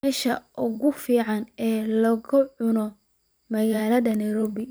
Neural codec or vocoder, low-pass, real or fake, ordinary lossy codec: vocoder, 44.1 kHz, 128 mel bands every 512 samples, BigVGAN v2; none; fake; none